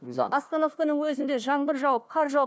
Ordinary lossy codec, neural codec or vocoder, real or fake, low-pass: none; codec, 16 kHz, 1 kbps, FunCodec, trained on Chinese and English, 50 frames a second; fake; none